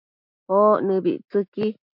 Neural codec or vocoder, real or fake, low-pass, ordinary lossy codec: none; real; 5.4 kHz; MP3, 48 kbps